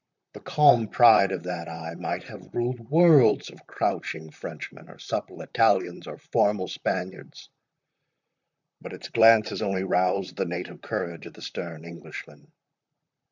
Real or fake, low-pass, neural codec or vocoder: fake; 7.2 kHz; vocoder, 44.1 kHz, 128 mel bands, Pupu-Vocoder